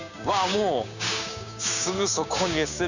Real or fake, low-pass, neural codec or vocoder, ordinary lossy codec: fake; 7.2 kHz; codec, 16 kHz, 6 kbps, DAC; none